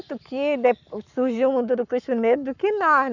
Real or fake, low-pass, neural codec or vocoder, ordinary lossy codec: fake; 7.2 kHz; codec, 44.1 kHz, 7.8 kbps, Pupu-Codec; none